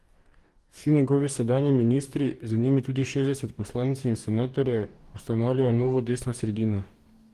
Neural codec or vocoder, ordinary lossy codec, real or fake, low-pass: codec, 44.1 kHz, 2.6 kbps, DAC; Opus, 24 kbps; fake; 14.4 kHz